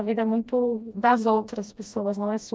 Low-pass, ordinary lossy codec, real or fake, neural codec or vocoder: none; none; fake; codec, 16 kHz, 1 kbps, FreqCodec, smaller model